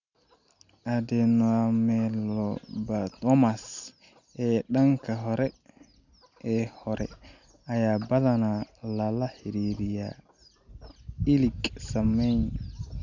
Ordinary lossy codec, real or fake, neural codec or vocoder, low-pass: none; real; none; 7.2 kHz